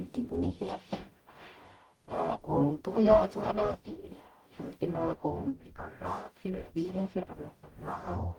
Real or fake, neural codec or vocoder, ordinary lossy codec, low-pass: fake; codec, 44.1 kHz, 0.9 kbps, DAC; Opus, 24 kbps; 19.8 kHz